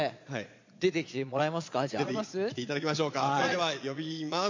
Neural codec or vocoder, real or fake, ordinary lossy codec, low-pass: vocoder, 22.05 kHz, 80 mel bands, Vocos; fake; MP3, 48 kbps; 7.2 kHz